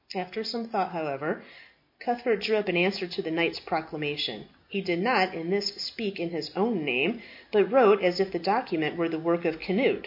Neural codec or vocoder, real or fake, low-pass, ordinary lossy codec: none; real; 5.4 kHz; MP3, 32 kbps